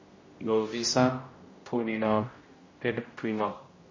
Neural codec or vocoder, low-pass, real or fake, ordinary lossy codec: codec, 16 kHz, 0.5 kbps, X-Codec, HuBERT features, trained on general audio; 7.2 kHz; fake; MP3, 32 kbps